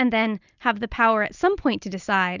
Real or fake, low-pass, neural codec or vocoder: real; 7.2 kHz; none